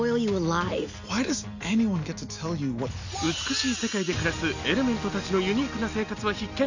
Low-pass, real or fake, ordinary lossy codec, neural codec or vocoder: 7.2 kHz; real; none; none